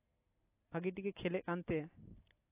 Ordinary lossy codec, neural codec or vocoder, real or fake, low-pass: AAC, 24 kbps; none; real; 3.6 kHz